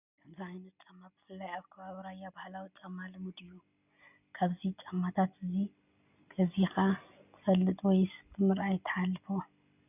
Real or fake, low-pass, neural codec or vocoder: real; 3.6 kHz; none